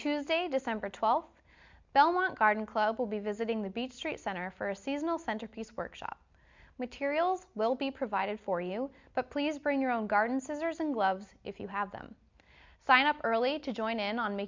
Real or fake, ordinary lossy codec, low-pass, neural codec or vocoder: real; MP3, 64 kbps; 7.2 kHz; none